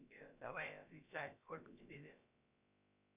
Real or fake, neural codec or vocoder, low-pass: fake; codec, 16 kHz, about 1 kbps, DyCAST, with the encoder's durations; 3.6 kHz